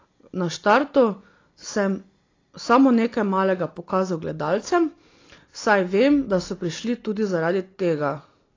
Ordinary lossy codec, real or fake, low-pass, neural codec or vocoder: AAC, 32 kbps; real; 7.2 kHz; none